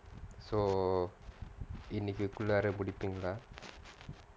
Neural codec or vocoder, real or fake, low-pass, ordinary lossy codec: none; real; none; none